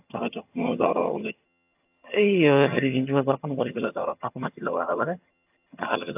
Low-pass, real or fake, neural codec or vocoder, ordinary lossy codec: 3.6 kHz; fake; vocoder, 22.05 kHz, 80 mel bands, HiFi-GAN; AAC, 32 kbps